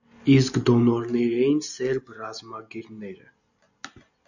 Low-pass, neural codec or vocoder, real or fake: 7.2 kHz; none; real